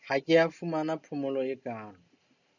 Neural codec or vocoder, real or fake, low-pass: none; real; 7.2 kHz